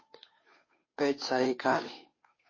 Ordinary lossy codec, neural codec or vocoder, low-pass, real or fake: MP3, 32 kbps; codec, 16 kHz, 2 kbps, FunCodec, trained on Chinese and English, 25 frames a second; 7.2 kHz; fake